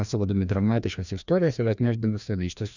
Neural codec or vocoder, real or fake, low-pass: codec, 16 kHz, 1 kbps, FreqCodec, larger model; fake; 7.2 kHz